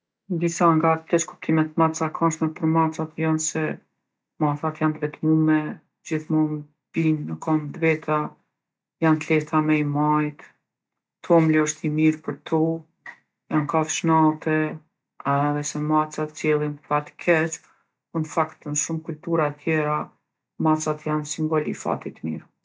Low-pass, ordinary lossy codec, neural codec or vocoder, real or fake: none; none; none; real